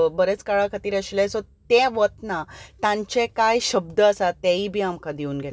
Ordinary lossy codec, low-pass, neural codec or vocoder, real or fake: none; none; none; real